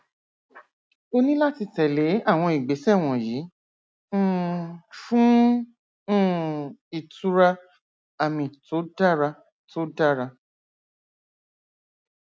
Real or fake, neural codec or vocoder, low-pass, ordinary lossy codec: real; none; none; none